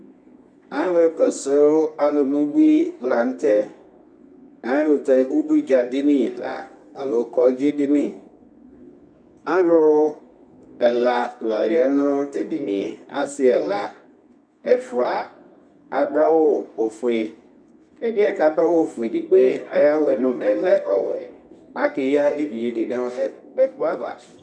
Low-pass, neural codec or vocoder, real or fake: 9.9 kHz; codec, 24 kHz, 0.9 kbps, WavTokenizer, medium music audio release; fake